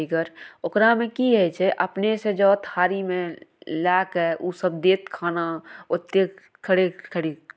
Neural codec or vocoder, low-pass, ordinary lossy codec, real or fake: none; none; none; real